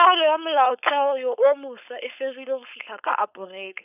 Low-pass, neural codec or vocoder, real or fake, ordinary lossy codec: 3.6 kHz; codec, 16 kHz, 4.8 kbps, FACodec; fake; none